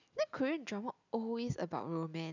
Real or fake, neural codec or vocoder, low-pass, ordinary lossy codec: real; none; 7.2 kHz; none